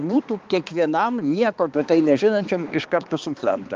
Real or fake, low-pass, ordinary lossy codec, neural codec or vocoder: fake; 7.2 kHz; Opus, 24 kbps; codec, 16 kHz, 2 kbps, X-Codec, HuBERT features, trained on balanced general audio